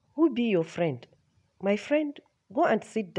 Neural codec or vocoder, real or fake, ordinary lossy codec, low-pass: none; real; none; 10.8 kHz